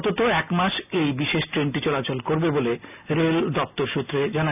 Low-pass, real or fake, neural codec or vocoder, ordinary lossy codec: 3.6 kHz; real; none; none